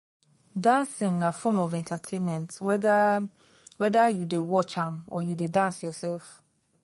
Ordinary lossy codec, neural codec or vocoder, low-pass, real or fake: MP3, 48 kbps; codec, 32 kHz, 1.9 kbps, SNAC; 14.4 kHz; fake